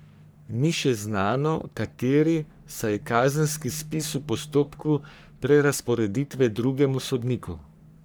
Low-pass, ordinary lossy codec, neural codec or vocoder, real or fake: none; none; codec, 44.1 kHz, 3.4 kbps, Pupu-Codec; fake